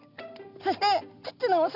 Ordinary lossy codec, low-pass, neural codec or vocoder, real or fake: none; 5.4 kHz; none; real